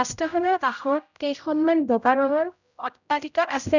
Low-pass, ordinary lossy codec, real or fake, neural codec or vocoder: 7.2 kHz; none; fake; codec, 16 kHz, 0.5 kbps, X-Codec, HuBERT features, trained on general audio